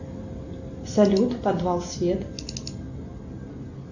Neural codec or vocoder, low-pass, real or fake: none; 7.2 kHz; real